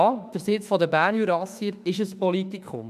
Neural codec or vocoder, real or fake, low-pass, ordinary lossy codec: autoencoder, 48 kHz, 32 numbers a frame, DAC-VAE, trained on Japanese speech; fake; 14.4 kHz; none